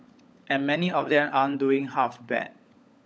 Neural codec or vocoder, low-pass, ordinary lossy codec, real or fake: codec, 16 kHz, 16 kbps, FunCodec, trained on LibriTTS, 50 frames a second; none; none; fake